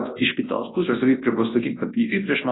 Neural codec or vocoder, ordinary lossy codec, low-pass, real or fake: codec, 24 kHz, 0.9 kbps, WavTokenizer, large speech release; AAC, 16 kbps; 7.2 kHz; fake